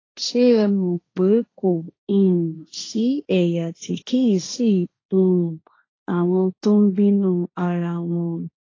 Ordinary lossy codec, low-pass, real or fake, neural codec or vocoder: AAC, 32 kbps; 7.2 kHz; fake; codec, 16 kHz, 1.1 kbps, Voila-Tokenizer